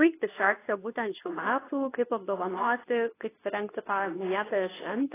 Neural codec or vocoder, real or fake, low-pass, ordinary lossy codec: codec, 24 kHz, 0.9 kbps, WavTokenizer, medium speech release version 2; fake; 3.6 kHz; AAC, 16 kbps